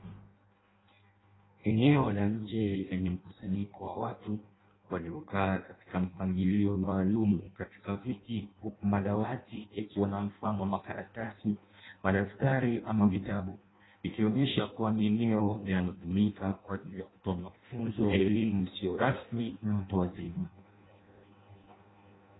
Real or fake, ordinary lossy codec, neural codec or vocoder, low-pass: fake; AAC, 16 kbps; codec, 16 kHz in and 24 kHz out, 0.6 kbps, FireRedTTS-2 codec; 7.2 kHz